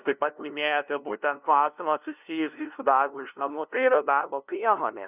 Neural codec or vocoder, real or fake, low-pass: codec, 16 kHz, 0.5 kbps, FunCodec, trained on LibriTTS, 25 frames a second; fake; 3.6 kHz